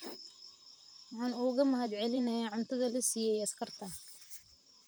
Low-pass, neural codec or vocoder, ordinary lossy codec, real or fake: none; vocoder, 44.1 kHz, 128 mel bands, Pupu-Vocoder; none; fake